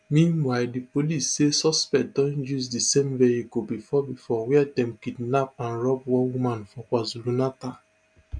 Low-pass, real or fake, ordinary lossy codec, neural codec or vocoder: 9.9 kHz; real; none; none